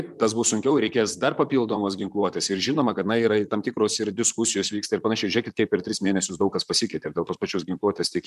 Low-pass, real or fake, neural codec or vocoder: 14.4 kHz; fake; vocoder, 44.1 kHz, 128 mel bands, Pupu-Vocoder